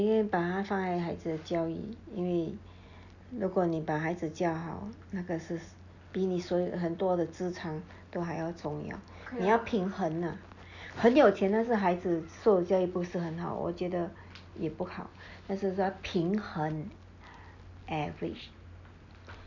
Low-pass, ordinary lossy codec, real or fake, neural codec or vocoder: 7.2 kHz; none; real; none